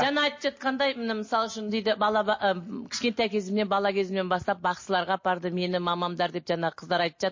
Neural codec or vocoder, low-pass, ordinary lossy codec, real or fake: none; 7.2 kHz; MP3, 32 kbps; real